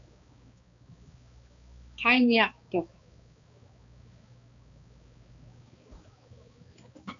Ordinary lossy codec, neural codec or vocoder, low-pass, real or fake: MP3, 64 kbps; codec, 16 kHz, 2 kbps, X-Codec, HuBERT features, trained on balanced general audio; 7.2 kHz; fake